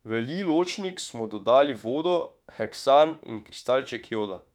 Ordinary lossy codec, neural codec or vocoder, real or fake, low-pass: none; autoencoder, 48 kHz, 32 numbers a frame, DAC-VAE, trained on Japanese speech; fake; 19.8 kHz